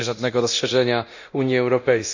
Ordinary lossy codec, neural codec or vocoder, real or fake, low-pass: none; codec, 24 kHz, 0.9 kbps, DualCodec; fake; 7.2 kHz